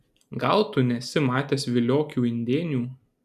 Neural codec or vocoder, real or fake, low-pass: none; real; 14.4 kHz